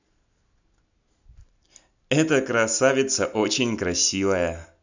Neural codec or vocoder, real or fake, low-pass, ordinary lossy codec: none; real; 7.2 kHz; none